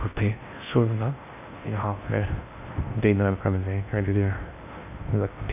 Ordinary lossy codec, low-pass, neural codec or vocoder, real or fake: none; 3.6 kHz; codec, 16 kHz in and 24 kHz out, 0.6 kbps, FocalCodec, streaming, 4096 codes; fake